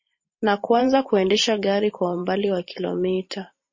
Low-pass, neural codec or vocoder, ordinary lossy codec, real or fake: 7.2 kHz; vocoder, 44.1 kHz, 128 mel bands every 512 samples, BigVGAN v2; MP3, 32 kbps; fake